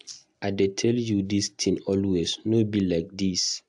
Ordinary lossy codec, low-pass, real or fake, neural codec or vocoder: AAC, 64 kbps; 10.8 kHz; real; none